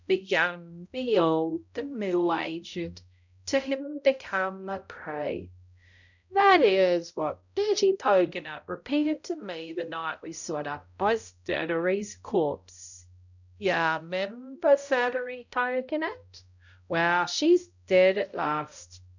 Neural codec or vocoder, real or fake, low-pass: codec, 16 kHz, 0.5 kbps, X-Codec, HuBERT features, trained on balanced general audio; fake; 7.2 kHz